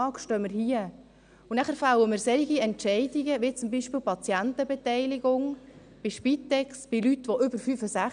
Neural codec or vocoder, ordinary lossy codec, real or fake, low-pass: none; none; real; 9.9 kHz